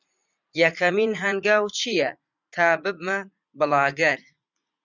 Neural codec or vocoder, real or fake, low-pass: vocoder, 44.1 kHz, 80 mel bands, Vocos; fake; 7.2 kHz